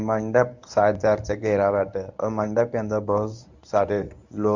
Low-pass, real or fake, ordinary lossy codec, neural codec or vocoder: 7.2 kHz; fake; Opus, 64 kbps; codec, 24 kHz, 0.9 kbps, WavTokenizer, medium speech release version 1